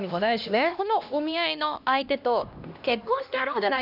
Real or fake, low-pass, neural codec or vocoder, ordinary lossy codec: fake; 5.4 kHz; codec, 16 kHz, 1 kbps, X-Codec, HuBERT features, trained on LibriSpeech; none